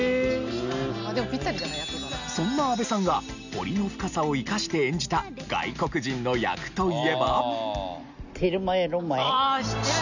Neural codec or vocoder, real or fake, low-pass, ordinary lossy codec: none; real; 7.2 kHz; none